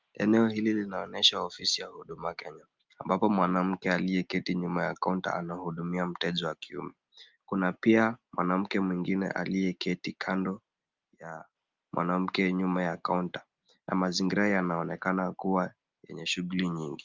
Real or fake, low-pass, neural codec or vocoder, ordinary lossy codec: real; 7.2 kHz; none; Opus, 24 kbps